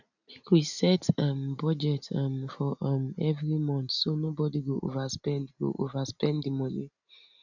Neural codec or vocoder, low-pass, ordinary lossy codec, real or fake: none; 7.2 kHz; none; real